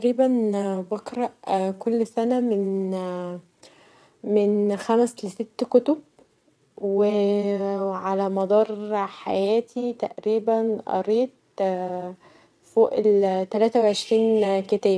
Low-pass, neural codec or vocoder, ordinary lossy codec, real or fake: none; vocoder, 22.05 kHz, 80 mel bands, WaveNeXt; none; fake